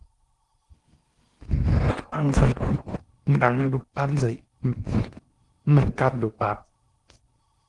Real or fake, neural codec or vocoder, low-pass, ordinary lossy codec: fake; codec, 16 kHz in and 24 kHz out, 0.6 kbps, FocalCodec, streaming, 4096 codes; 10.8 kHz; Opus, 24 kbps